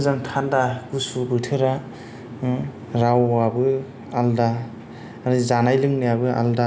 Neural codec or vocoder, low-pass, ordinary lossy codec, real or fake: none; none; none; real